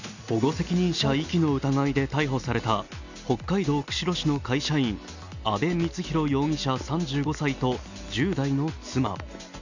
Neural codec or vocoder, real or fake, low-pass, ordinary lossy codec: none; real; 7.2 kHz; none